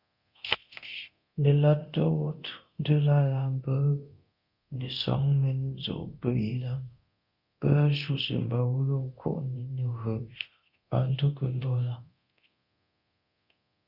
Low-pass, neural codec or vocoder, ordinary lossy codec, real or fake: 5.4 kHz; codec, 24 kHz, 0.9 kbps, DualCodec; Opus, 64 kbps; fake